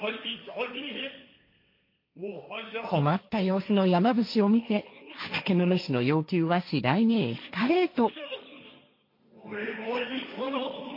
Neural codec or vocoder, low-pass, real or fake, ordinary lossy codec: codec, 16 kHz, 1.1 kbps, Voila-Tokenizer; 5.4 kHz; fake; MP3, 32 kbps